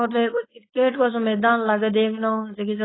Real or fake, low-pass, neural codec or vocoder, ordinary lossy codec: fake; 7.2 kHz; codec, 16 kHz, 4.8 kbps, FACodec; AAC, 16 kbps